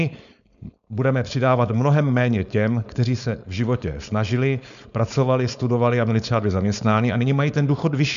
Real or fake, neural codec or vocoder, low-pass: fake; codec, 16 kHz, 4.8 kbps, FACodec; 7.2 kHz